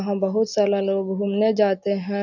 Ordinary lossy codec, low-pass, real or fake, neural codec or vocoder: none; 7.2 kHz; real; none